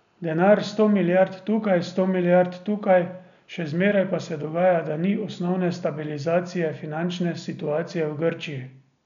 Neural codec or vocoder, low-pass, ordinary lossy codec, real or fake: none; 7.2 kHz; none; real